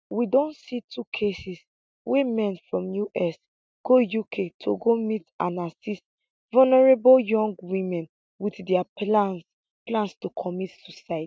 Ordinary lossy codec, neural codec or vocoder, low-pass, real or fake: none; none; none; real